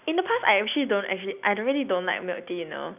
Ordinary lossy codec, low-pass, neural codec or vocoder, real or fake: none; 3.6 kHz; none; real